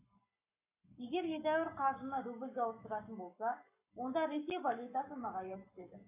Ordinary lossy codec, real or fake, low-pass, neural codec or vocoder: none; fake; 3.6 kHz; codec, 44.1 kHz, 7.8 kbps, Pupu-Codec